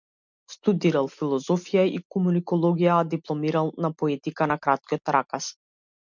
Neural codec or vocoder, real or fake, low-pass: none; real; 7.2 kHz